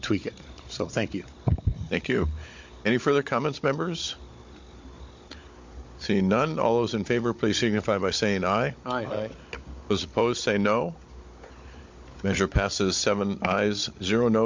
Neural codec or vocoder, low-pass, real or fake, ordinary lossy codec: codec, 16 kHz, 16 kbps, FunCodec, trained on Chinese and English, 50 frames a second; 7.2 kHz; fake; MP3, 48 kbps